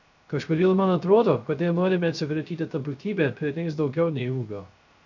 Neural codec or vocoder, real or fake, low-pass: codec, 16 kHz, 0.3 kbps, FocalCodec; fake; 7.2 kHz